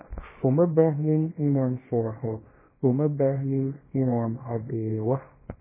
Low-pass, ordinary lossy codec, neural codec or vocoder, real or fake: 3.6 kHz; MP3, 16 kbps; codec, 24 kHz, 0.9 kbps, WavTokenizer, small release; fake